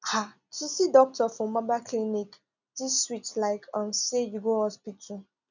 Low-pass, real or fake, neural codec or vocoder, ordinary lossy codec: 7.2 kHz; real; none; none